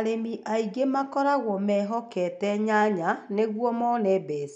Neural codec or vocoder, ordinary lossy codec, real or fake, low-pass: none; none; real; 9.9 kHz